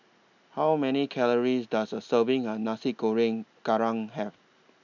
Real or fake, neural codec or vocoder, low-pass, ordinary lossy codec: real; none; 7.2 kHz; none